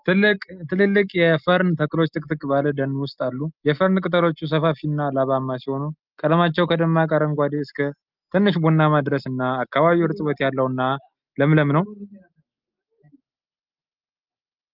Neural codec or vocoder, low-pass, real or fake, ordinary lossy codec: none; 5.4 kHz; real; Opus, 32 kbps